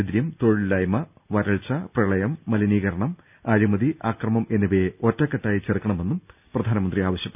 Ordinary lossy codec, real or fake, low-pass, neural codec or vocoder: none; real; 3.6 kHz; none